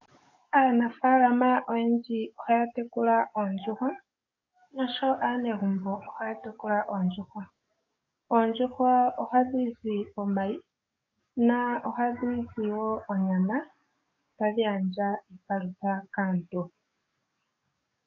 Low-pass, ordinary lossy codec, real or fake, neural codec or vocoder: 7.2 kHz; AAC, 48 kbps; fake; codec, 44.1 kHz, 7.8 kbps, DAC